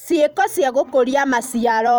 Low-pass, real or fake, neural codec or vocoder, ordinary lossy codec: none; fake; vocoder, 44.1 kHz, 128 mel bands every 256 samples, BigVGAN v2; none